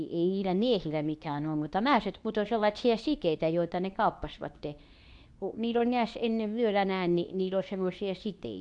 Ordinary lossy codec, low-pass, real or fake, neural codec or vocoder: none; 10.8 kHz; fake; codec, 24 kHz, 0.9 kbps, WavTokenizer, medium speech release version 2